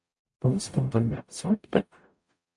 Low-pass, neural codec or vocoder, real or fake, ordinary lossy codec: 10.8 kHz; codec, 44.1 kHz, 0.9 kbps, DAC; fake; MP3, 64 kbps